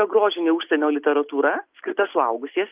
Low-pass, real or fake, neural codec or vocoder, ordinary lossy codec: 3.6 kHz; real; none; Opus, 32 kbps